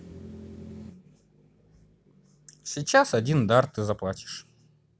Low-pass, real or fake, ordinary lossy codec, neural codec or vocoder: none; real; none; none